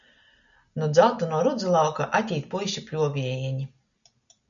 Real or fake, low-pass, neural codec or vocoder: real; 7.2 kHz; none